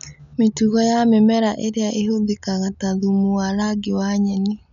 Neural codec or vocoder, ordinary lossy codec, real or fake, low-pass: none; none; real; 7.2 kHz